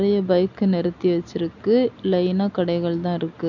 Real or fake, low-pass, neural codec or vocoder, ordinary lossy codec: real; 7.2 kHz; none; none